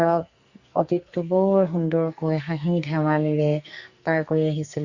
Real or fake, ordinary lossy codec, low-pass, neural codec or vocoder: fake; Opus, 64 kbps; 7.2 kHz; codec, 44.1 kHz, 2.6 kbps, SNAC